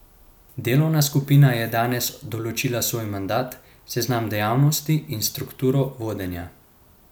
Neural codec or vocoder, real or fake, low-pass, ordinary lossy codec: none; real; none; none